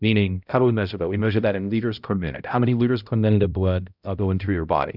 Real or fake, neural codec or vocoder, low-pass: fake; codec, 16 kHz, 0.5 kbps, X-Codec, HuBERT features, trained on balanced general audio; 5.4 kHz